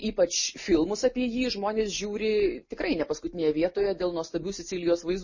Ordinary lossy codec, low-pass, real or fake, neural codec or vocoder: MP3, 32 kbps; 7.2 kHz; real; none